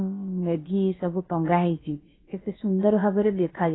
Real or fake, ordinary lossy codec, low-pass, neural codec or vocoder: fake; AAC, 16 kbps; 7.2 kHz; codec, 16 kHz, about 1 kbps, DyCAST, with the encoder's durations